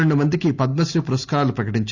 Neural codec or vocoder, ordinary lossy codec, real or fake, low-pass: none; Opus, 64 kbps; real; 7.2 kHz